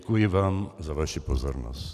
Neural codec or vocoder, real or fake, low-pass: none; real; 14.4 kHz